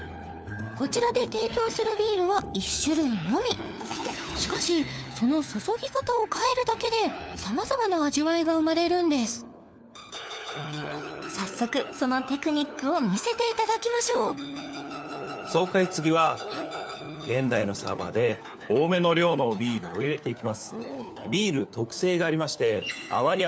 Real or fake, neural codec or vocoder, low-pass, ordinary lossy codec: fake; codec, 16 kHz, 4 kbps, FunCodec, trained on LibriTTS, 50 frames a second; none; none